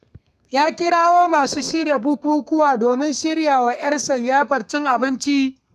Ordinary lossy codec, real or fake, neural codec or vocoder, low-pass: none; fake; codec, 32 kHz, 1.9 kbps, SNAC; 14.4 kHz